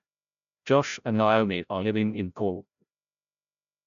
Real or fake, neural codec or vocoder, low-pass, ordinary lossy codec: fake; codec, 16 kHz, 0.5 kbps, FreqCodec, larger model; 7.2 kHz; none